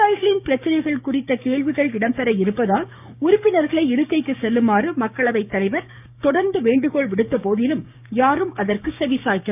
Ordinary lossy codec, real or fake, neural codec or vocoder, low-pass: none; fake; codec, 16 kHz, 6 kbps, DAC; 3.6 kHz